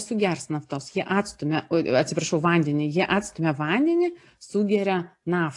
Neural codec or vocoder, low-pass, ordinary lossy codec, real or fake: none; 10.8 kHz; AAC, 48 kbps; real